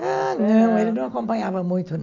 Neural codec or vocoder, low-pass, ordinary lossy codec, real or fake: none; 7.2 kHz; none; real